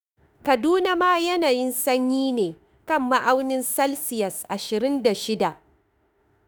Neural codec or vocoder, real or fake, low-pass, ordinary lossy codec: autoencoder, 48 kHz, 32 numbers a frame, DAC-VAE, trained on Japanese speech; fake; none; none